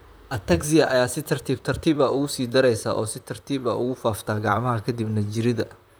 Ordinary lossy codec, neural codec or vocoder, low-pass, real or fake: none; vocoder, 44.1 kHz, 128 mel bands, Pupu-Vocoder; none; fake